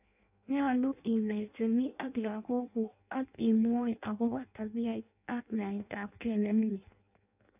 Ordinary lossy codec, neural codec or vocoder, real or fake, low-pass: none; codec, 16 kHz in and 24 kHz out, 0.6 kbps, FireRedTTS-2 codec; fake; 3.6 kHz